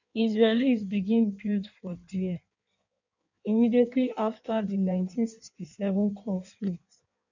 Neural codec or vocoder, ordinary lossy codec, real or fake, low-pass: codec, 16 kHz in and 24 kHz out, 1.1 kbps, FireRedTTS-2 codec; none; fake; 7.2 kHz